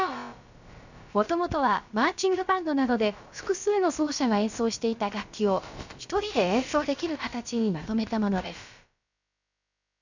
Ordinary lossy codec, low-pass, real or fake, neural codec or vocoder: none; 7.2 kHz; fake; codec, 16 kHz, about 1 kbps, DyCAST, with the encoder's durations